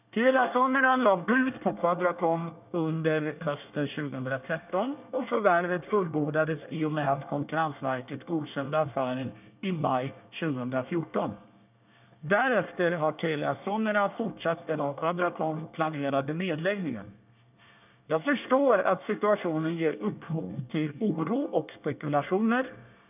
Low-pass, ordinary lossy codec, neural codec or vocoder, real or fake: 3.6 kHz; none; codec, 24 kHz, 1 kbps, SNAC; fake